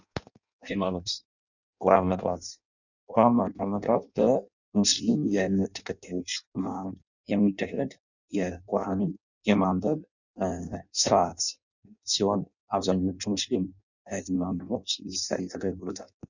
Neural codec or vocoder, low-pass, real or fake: codec, 16 kHz in and 24 kHz out, 0.6 kbps, FireRedTTS-2 codec; 7.2 kHz; fake